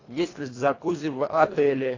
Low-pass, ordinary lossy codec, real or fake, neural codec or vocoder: 7.2 kHz; AAC, 32 kbps; fake; codec, 24 kHz, 1.5 kbps, HILCodec